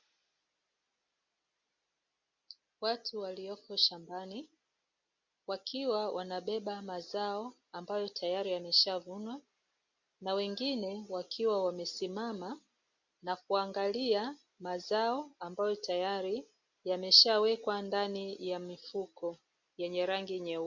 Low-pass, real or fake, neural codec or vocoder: 7.2 kHz; real; none